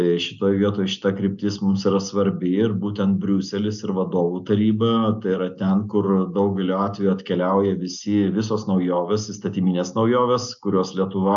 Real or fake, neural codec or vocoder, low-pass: real; none; 7.2 kHz